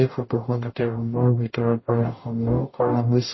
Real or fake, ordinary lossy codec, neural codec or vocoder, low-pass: fake; MP3, 24 kbps; codec, 44.1 kHz, 0.9 kbps, DAC; 7.2 kHz